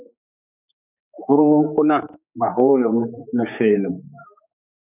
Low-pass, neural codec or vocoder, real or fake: 3.6 kHz; codec, 16 kHz, 4 kbps, X-Codec, HuBERT features, trained on general audio; fake